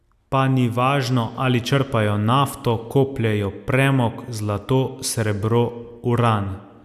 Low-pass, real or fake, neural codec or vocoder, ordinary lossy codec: 14.4 kHz; real; none; none